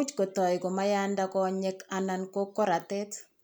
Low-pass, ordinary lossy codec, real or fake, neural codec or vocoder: none; none; real; none